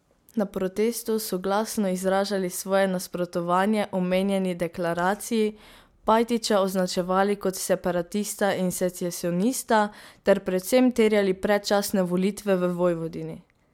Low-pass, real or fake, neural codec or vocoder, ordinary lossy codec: 19.8 kHz; real; none; MP3, 96 kbps